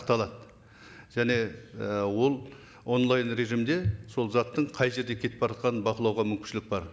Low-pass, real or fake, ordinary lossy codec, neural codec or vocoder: none; real; none; none